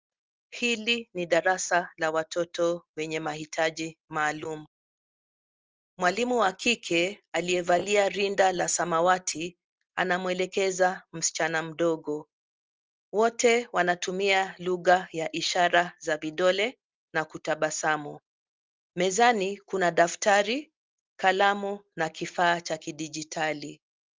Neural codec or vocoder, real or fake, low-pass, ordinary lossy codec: none; real; 7.2 kHz; Opus, 24 kbps